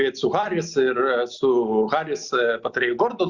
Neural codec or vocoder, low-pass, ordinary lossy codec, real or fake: none; 7.2 kHz; Opus, 64 kbps; real